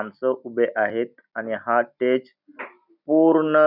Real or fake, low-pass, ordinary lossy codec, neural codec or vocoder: real; 5.4 kHz; none; none